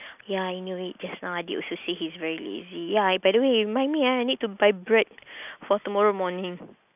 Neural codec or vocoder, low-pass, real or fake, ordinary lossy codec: none; 3.6 kHz; real; none